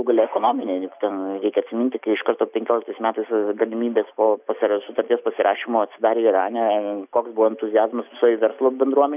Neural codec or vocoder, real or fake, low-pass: none; real; 3.6 kHz